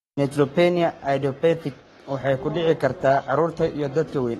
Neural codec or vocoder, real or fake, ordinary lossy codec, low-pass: codec, 44.1 kHz, 7.8 kbps, Pupu-Codec; fake; AAC, 32 kbps; 19.8 kHz